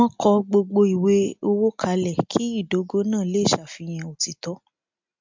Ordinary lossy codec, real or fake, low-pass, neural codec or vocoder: MP3, 64 kbps; real; 7.2 kHz; none